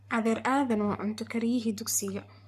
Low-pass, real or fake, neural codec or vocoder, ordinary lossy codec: 14.4 kHz; fake; codec, 44.1 kHz, 7.8 kbps, Pupu-Codec; none